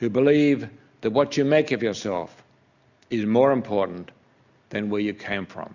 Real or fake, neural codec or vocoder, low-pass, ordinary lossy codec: real; none; 7.2 kHz; Opus, 64 kbps